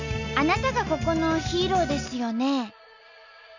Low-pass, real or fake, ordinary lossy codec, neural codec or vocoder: 7.2 kHz; real; none; none